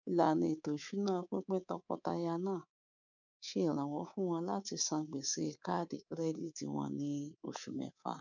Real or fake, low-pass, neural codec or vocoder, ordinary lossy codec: fake; 7.2 kHz; codec, 24 kHz, 3.1 kbps, DualCodec; none